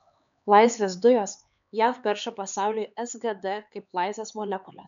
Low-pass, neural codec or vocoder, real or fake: 7.2 kHz; codec, 16 kHz, 4 kbps, X-Codec, HuBERT features, trained on LibriSpeech; fake